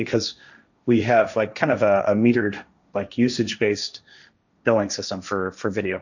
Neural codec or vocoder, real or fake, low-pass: codec, 16 kHz, 1.1 kbps, Voila-Tokenizer; fake; 7.2 kHz